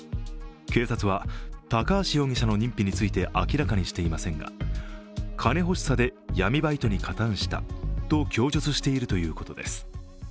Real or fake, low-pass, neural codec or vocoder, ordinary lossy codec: real; none; none; none